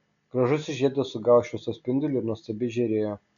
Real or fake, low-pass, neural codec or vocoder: real; 7.2 kHz; none